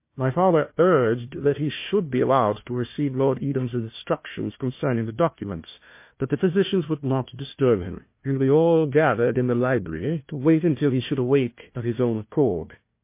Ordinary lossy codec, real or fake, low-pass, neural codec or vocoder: MP3, 24 kbps; fake; 3.6 kHz; codec, 16 kHz, 1 kbps, FunCodec, trained on Chinese and English, 50 frames a second